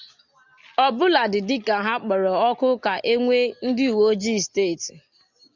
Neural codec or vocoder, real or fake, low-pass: none; real; 7.2 kHz